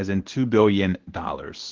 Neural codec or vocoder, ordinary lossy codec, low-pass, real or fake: codec, 24 kHz, 0.9 kbps, WavTokenizer, medium speech release version 1; Opus, 16 kbps; 7.2 kHz; fake